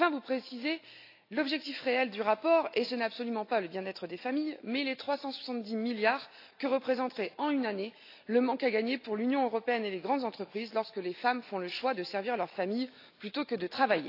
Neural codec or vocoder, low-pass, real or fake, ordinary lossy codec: none; 5.4 kHz; real; AAC, 32 kbps